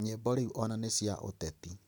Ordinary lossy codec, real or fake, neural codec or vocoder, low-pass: none; real; none; none